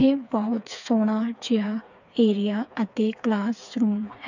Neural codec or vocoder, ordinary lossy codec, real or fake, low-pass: codec, 16 kHz, 4 kbps, FreqCodec, smaller model; none; fake; 7.2 kHz